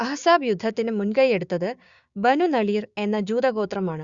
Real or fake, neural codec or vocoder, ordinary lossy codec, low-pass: fake; codec, 16 kHz, 6 kbps, DAC; Opus, 64 kbps; 7.2 kHz